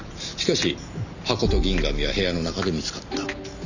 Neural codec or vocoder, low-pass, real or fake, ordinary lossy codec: none; 7.2 kHz; real; none